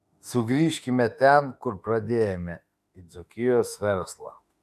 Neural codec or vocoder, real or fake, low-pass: autoencoder, 48 kHz, 32 numbers a frame, DAC-VAE, trained on Japanese speech; fake; 14.4 kHz